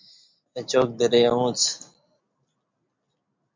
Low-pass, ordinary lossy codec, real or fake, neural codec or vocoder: 7.2 kHz; MP3, 64 kbps; real; none